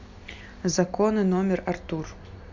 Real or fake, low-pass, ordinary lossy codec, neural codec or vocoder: real; 7.2 kHz; MP3, 48 kbps; none